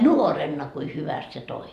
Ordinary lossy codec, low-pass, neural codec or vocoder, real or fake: none; 14.4 kHz; vocoder, 44.1 kHz, 128 mel bands every 512 samples, BigVGAN v2; fake